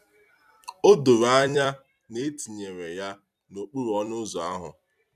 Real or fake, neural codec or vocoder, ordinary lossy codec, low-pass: fake; vocoder, 44.1 kHz, 128 mel bands every 256 samples, BigVGAN v2; none; 14.4 kHz